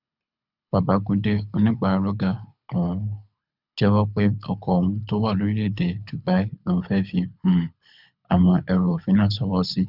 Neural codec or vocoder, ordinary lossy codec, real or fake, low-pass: codec, 24 kHz, 6 kbps, HILCodec; none; fake; 5.4 kHz